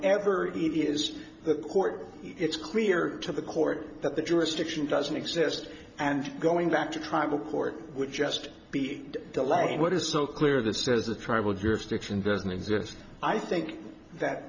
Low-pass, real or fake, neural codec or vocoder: 7.2 kHz; fake; vocoder, 44.1 kHz, 128 mel bands every 512 samples, BigVGAN v2